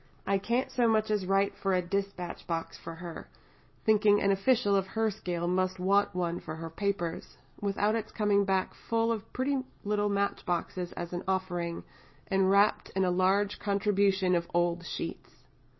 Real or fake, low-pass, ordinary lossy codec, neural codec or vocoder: real; 7.2 kHz; MP3, 24 kbps; none